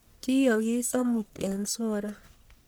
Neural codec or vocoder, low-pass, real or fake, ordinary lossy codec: codec, 44.1 kHz, 1.7 kbps, Pupu-Codec; none; fake; none